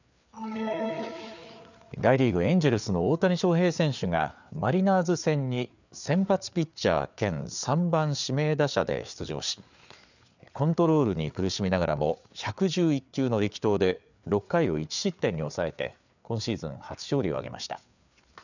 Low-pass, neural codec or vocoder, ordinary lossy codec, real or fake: 7.2 kHz; codec, 16 kHz, 4 kbps, FreqCodec, larger model; none; fake